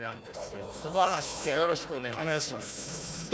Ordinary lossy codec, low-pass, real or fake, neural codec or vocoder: none; none; fake; codec, 16 kHz, 1 kbps, FunCodec, trained on Chinese and English, 50 frames a second